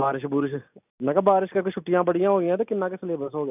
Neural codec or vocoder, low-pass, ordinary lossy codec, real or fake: none; 3.6 kHz; none; real